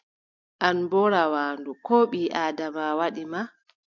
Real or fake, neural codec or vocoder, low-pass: real; none; 7.2 kHz